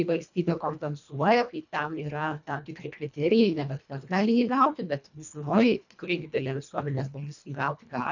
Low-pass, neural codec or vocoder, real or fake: 7.2 kHz; codec, 24 kHz, 1.5 kbps, HILCodec; fake